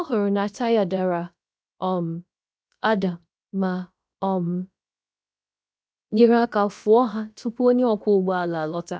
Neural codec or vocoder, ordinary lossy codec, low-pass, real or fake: codec, 16 kHz, about 1 kbps, DyCAST, with the encoder's durations; none; none; fake